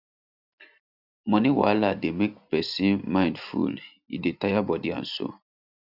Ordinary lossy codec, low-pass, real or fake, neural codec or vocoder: none; 5.4 kHz; real; none